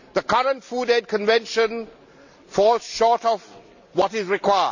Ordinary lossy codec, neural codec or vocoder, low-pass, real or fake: none; none; 7.2 kHz; real